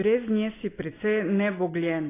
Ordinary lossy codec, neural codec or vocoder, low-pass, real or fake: AAC, 16 kbps; none; 3.6 kHz; real